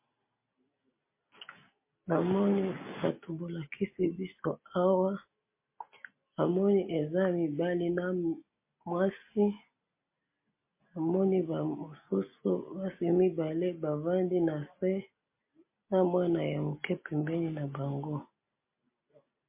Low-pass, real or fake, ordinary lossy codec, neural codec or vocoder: 3.6 kHz; real; MP3, 24 kbps; none